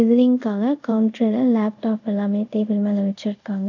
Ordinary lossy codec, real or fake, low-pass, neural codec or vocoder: none; fake; 7.2 kHz; codec, 24 kHz, 0.5 kbps, DualCodec